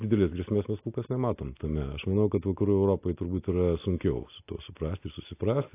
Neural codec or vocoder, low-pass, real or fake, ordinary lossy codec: none; 3.6 kHz; real; AAC, 24 kbps